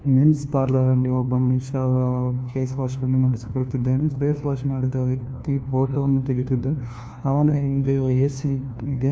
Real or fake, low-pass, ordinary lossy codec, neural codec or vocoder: fake; none; none; codec, 16 kHz, 1 kbps, FunCodec, trained on LibriTTS, 50 frames a second